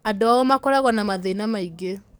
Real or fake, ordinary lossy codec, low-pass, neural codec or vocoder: fake; none; none; codec, 44.1 kHz, 7.8 kbps, DAC